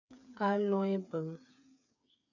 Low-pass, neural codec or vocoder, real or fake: 7.2 kHz; codec, 16 kHz, 8 kbps, FreqCodec, smaller model; fake